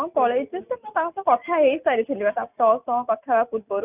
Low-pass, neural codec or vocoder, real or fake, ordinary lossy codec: 3.6 kHz; none; real; none